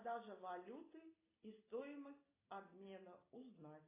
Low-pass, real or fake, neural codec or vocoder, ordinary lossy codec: 3.6 kHz; real; none; AAC, 16 kbps